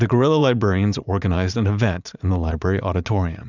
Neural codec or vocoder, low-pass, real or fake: vocoder, 44.1 kHz, 80 mel bands, Vocos; 7.2 kHz; fake